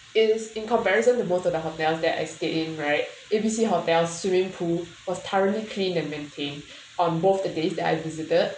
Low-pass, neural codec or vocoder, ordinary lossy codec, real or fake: none; none; none; real